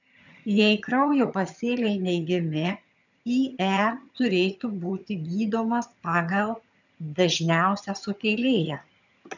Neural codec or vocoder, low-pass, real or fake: vocoder, 22.05 kHz, 80 mel bands, HiFi-GAN; 7.2 kHz; fake